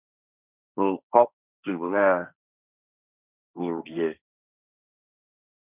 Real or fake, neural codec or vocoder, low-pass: fake; codec, 16 kHz, 1.1 kbps, Voila-Tokenizer; 3.6 kHz